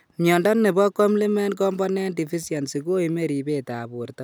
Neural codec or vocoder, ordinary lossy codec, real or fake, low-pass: none; none; real; none